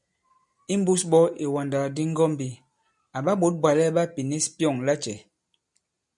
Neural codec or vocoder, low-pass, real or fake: none; 10.8 kHz; real